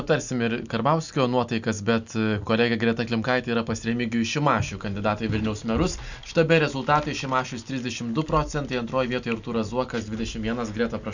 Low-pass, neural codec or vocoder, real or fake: 7.2 kHz; none; real